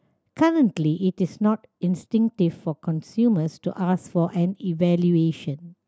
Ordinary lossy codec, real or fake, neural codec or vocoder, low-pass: none; real; none; none